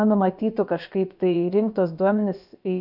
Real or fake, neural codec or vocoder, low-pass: fake; codec, 16 kHz, about 1 kbps, DyCAST, with the encoder's durations; 5.4 kHz